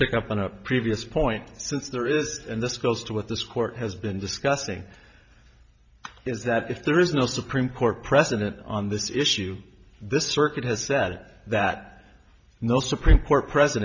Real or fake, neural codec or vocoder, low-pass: fake; vocoder, 44.1 kHz, 128 mel bands every 512 samples, BigVGAN v2; 7.2 kHz